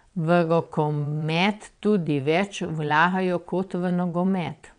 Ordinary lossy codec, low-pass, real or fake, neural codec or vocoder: none; 9.9 kHz; fake; vocoder, 22.05 kHz, 80 mel bands, WaveNeXt